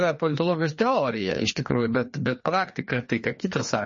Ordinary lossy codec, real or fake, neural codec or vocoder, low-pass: MP3, 32 kbps; fake; codec, 16 kHz, 2 kbps, FreqCodec, larger model; 7.2 kHz